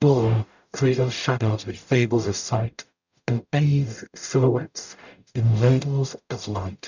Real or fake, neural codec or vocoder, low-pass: fake; codec, 44.1 kHz, 0.9 kbps, DAC; 7.2 kHz